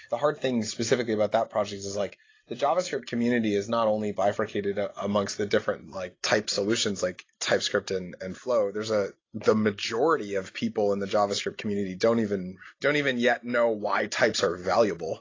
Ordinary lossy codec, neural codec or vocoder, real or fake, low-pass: AAC, 32 kbps; none; real; 7.2 kHz